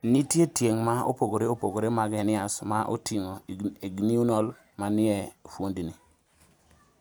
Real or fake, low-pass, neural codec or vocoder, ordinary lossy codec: real; none; none; none